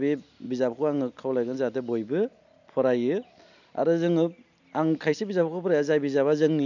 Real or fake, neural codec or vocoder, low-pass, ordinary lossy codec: real; none; 7.2 kHz; none